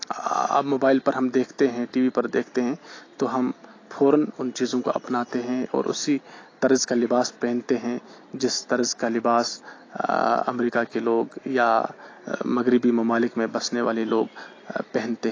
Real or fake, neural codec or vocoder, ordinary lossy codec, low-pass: real; none; AAC, 32 kbps; 7.2 kHz